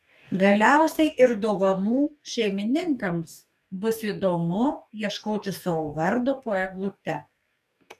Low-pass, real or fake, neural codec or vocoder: 14.4 kHz; fake; codec, 44.1 kHz, 2.6 kbps, DAC